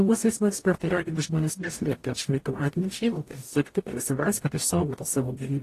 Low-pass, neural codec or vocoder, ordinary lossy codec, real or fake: 14.4 kHz; codec, 44.1 kHz, 0.9 kbps, DAC; AAC, 48 kbps; fake